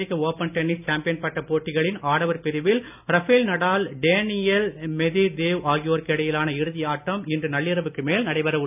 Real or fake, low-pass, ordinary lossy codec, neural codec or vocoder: real; 3.6 kHz; none; none